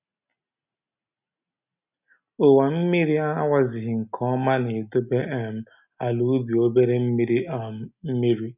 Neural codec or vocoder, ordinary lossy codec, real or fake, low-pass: none; none; real; 3.6 kHz